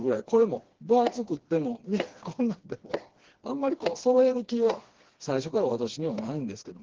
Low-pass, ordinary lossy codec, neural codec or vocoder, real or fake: 7.2 kHz; Opus, 16 kbps; codec, 16 kHz, 2 kbps, FreqCodec, smaller model; fake